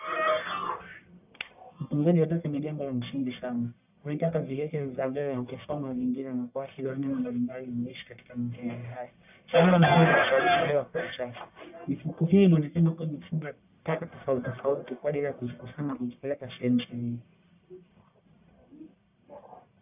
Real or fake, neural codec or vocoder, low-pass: fake; codec, 44.1 kHz, 1.7 kbps, Pupu-Codec; 3.6 kHz